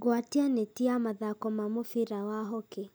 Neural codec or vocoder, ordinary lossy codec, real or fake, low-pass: none; none; real; none